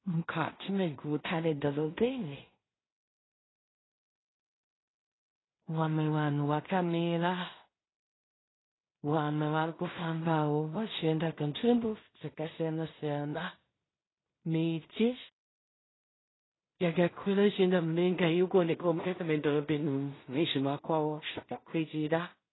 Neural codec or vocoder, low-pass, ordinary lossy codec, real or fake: codec, 16 kHz in and 24 kHz out, 0.4 kbps, LongCat-Audio-Codec, two codebook decoder; 7.2 kHz; AAC, 16 kbps; fake